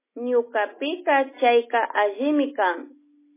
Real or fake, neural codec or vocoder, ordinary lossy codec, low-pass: real; none; MP3, 16 kbps; 3.6 kHz